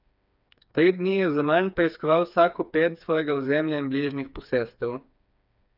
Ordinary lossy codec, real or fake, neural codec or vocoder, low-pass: none; fake; codec, 16 kHz, 4 kbps, FreqCodec, smaller model; 5.4 kHz